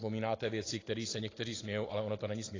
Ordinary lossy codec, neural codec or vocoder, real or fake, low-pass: AAC, 32 kbps; none; real; 7.2 kHz